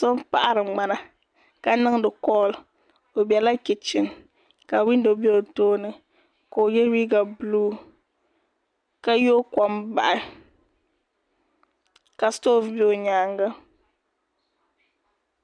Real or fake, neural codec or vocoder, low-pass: real; none; 9.9 kHz